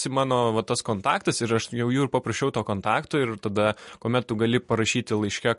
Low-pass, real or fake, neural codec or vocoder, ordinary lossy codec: 10.8 kHz; real; none; MP3, 48 kbps